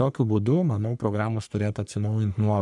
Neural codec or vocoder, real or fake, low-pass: codec, 44.1 kHz, 3.4 kbps, Pupu-Codec; fake; 10.8 kHz